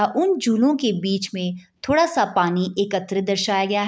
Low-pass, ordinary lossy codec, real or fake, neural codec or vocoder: none; none; real; none